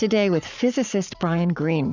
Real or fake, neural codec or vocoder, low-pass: fake; codec, 44.1 kHz, 7.8 kbps, Pupu-Codec; 7.2 kHz